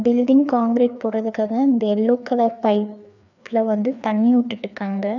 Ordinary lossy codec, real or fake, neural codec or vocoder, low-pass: none; fake; codec, 16 kHz, 2 kbps, FreqCodec, larger model; 7.2 kHz